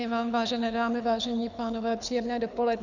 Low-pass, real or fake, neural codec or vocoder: 7.2 kHz; fake; codec, 24 kHz, 6 kbps, HILCodec